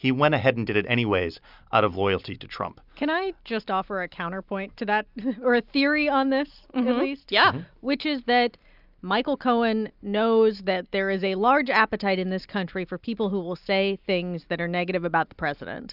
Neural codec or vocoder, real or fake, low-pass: none; real; 5.4 kHz